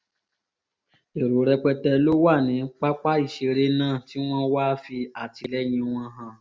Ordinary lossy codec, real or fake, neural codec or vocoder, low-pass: none; real; none; none